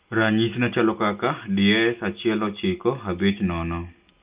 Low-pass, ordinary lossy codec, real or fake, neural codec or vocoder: 3.6 kHz; Opus, 24 kbps; real; none